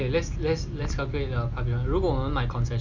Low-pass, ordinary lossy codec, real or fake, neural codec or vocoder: 7.2 kHz; none; real; none